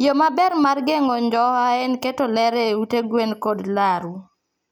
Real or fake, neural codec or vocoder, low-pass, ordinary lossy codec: real; none; none; none